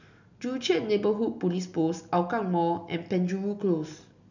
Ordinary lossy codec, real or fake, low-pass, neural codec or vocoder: none; real; 7.2 kHz; none